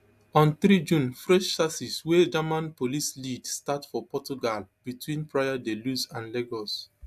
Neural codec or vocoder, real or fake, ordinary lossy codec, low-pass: none; real; AAC, 96 kbps; 14.4 kHz